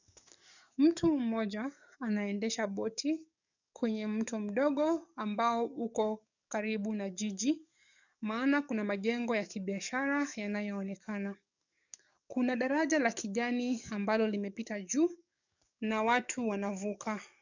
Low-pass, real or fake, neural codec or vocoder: 7.2 kHz; fake; codec, 44.1 kHz, 7.8 kbps, DAC